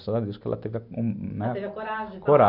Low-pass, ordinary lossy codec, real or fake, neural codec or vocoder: 5.4 kHz; none; real; none